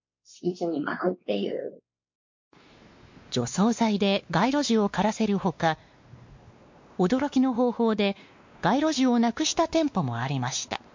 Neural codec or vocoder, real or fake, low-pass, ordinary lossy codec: codec, 16 kHz, 2 kbps, X-Codec, WavLM features, trained on Multilingual LibriSpeech; fake; 7.2 kHz; MP3, 48 kbps